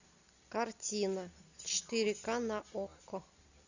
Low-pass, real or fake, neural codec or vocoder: 7.2 kHz; real; none